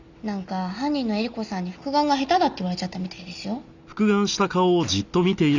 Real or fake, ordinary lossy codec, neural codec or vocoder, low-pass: real; none; none; 7.2 kHz